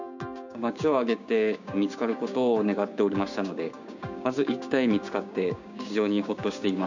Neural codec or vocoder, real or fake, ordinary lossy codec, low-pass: codec, 16 kHz, 6 kbps, DAC; fake; none; 7.2 kHz